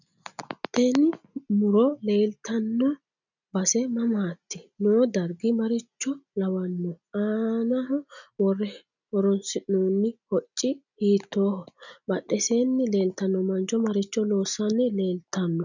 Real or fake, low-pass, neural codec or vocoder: real; 7.2 kHz; none